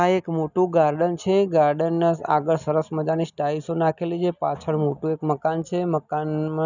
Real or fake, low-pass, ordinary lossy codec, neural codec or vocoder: real; 7.2 kHz; none; none